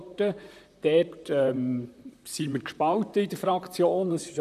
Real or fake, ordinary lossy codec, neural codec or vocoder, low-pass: fake; none; vocoder, 44.1 kHz, 128 mel bands, Pupu-Vocoder; 14.4 kHz